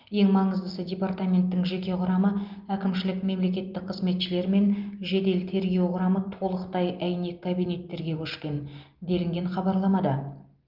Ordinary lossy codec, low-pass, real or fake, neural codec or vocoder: Opus, 32 kbps; 5.4 kHz; real; none